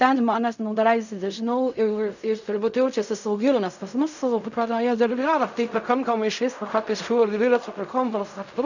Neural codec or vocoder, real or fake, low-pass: codec, 16 kHz in and 24 kHz out, 0.4 kbps, LongCat-Audio-Codec, fine tuned four codebook decoder; fake; 7.2 kHz